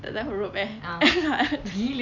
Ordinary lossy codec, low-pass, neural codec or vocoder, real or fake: none; 7.2 kHz; none; real